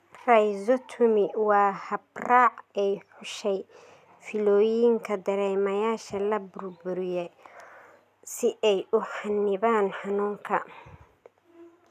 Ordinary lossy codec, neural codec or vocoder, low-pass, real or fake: none; none; 14.4 kHz; real